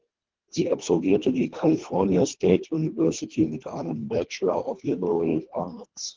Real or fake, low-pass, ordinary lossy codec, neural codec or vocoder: fake; 7.2 kHz; Opus, 16 kbps; codec, 24 kHz, 1.5 kbps, HILCodec